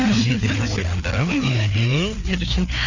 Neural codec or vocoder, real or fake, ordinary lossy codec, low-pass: codec, 16 kHz, 4 kbps, FreqCodec, larger model; fake; none; 7.2 kHz